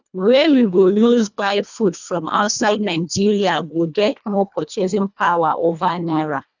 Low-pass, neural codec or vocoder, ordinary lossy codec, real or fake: 7.2 kHz; codec, 24 kHz, 1.5 kbps, HILCodec; none; fake